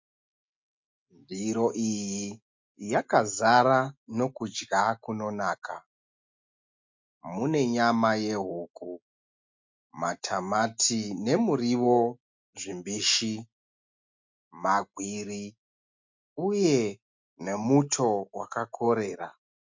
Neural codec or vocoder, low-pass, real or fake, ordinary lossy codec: none; 7.2 kHz; real; MP3, 48 kbps